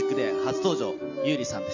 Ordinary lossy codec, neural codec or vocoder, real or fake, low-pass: none; none; real; 7.2 kHz